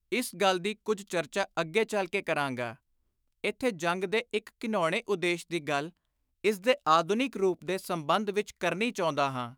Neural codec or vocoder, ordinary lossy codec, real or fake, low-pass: autoencoder, 48 kHz, 128 numbers a frame, DAC-VAE, trained on Japanese speech; none; fake; none